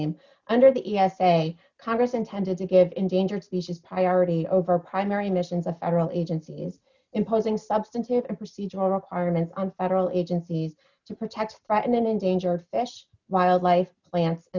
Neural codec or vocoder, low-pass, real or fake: none; 7.2 kHz; real